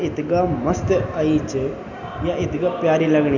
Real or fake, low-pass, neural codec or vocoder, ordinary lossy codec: real; 7.2 kHz; none; none